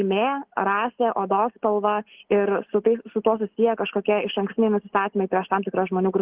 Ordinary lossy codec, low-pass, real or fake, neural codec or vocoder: Opus, 16 kbps; 3.6 kHz; real; none